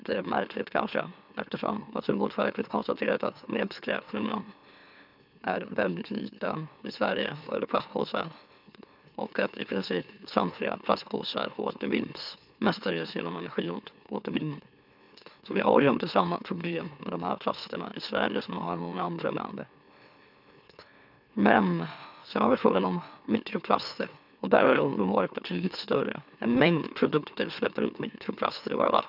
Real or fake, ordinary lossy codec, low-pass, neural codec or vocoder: fake; none; 5.4 kHz; autoencoder, 44.1 kHz, a latent of 192 numbers a frame, MeloTTS